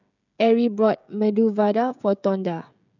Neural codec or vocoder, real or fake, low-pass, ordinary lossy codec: codec, 16 kHz, 16 kbps, FreqCodec, smaller model; fake; 7.2 kHz; none